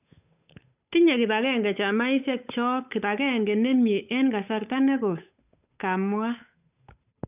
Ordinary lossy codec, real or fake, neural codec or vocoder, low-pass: none; fake; codec, 16 kHz, 8 kbps, FunCodec, trained on Chinese and English, 25 frames a second; 3.6 kHz